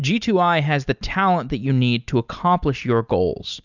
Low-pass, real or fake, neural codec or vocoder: 7.2 kHz; real; none